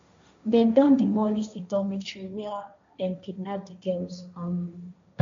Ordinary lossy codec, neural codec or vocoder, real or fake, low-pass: MP3, 64 kbps; codec, 16 kHz, 1.1 kbps, Voila-Tokenizer; fake; 7.2 kHz